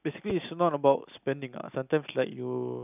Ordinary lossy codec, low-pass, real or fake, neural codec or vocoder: none; 3.6 kHz; real; none